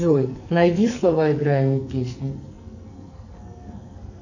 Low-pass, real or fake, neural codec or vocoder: 7.2 kHz; fake; codec, 44.1 kHz, 2.6 kbps, SNAC